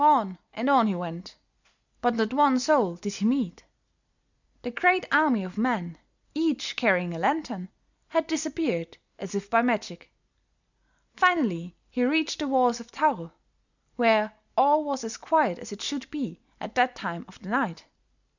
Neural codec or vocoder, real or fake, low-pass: none; real; 7.2 kHz